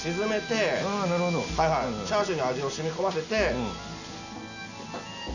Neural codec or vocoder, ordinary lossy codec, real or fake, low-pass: none; none; real; 7.2 kHz